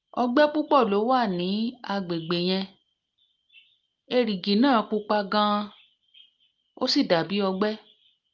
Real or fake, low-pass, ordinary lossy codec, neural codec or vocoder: real; 7.2 kHz; Opus, 32 kbps; none